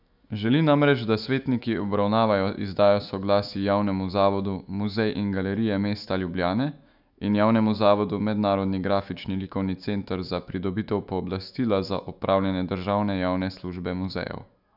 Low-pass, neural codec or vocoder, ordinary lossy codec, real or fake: 5.4 kHz; autoencoder, 48 kHz, 128 numbers a frame, DAC-VAE, trained on Japanese speech; none; fake